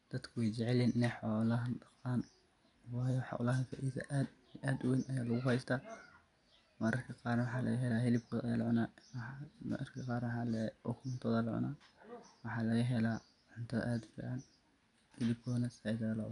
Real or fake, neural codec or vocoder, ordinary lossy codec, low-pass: real; none; none; 10.8 kHz